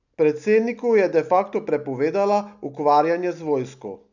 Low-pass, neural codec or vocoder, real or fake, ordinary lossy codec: 7.2 kHz; none; real; none